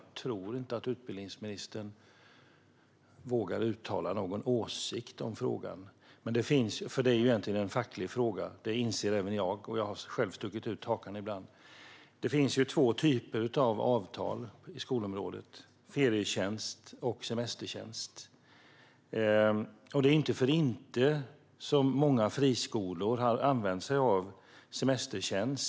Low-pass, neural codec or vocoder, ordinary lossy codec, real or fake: none; none; none; real